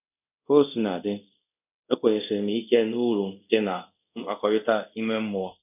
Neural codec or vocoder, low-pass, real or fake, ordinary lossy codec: codec, 24 kHz, 0.5 kbps, DualCodec; 3.6 kHz; fake; none